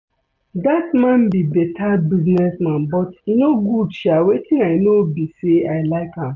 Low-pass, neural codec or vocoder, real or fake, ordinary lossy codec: 7.2 kHz; none; real; none